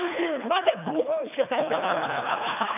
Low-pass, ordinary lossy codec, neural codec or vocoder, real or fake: 3.6 kHz; none; codec, 24 kHz, 3 kbps, HILCodec; fake